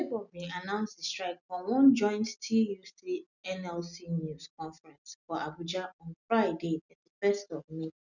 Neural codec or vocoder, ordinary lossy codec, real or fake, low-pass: none; none; real; 7.2 kHz